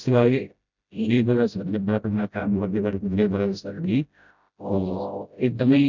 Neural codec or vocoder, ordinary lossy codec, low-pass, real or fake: codec, 16 kHz, 0.5 kbps, FreqCodec, smaller model; none; 7.2 kHz; fake